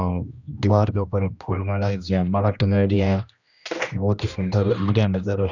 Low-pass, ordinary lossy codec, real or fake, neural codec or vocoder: 7.2 kHz; none; fake; codec, 16 kHz, 1 kbps, X-Codec, HuBERT features, trained on general audio